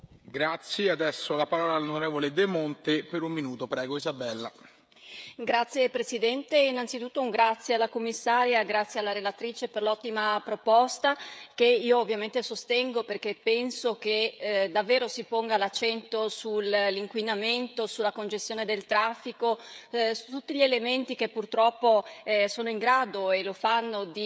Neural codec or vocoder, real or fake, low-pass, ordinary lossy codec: codec, 16 kHz, 16 kbps, FreqCodec, smaller model; fake; none; none